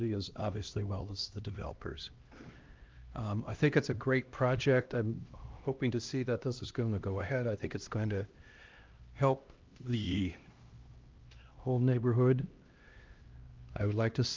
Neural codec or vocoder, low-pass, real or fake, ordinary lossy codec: codec, 16 kHz, 1 kbps, X-Codec, HuBERT features, trained on LibriSpeech; 7.2 kHz; fake; Opus, 32 kbps